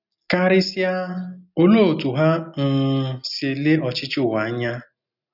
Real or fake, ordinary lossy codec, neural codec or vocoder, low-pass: real; none; none; 5.4 kHz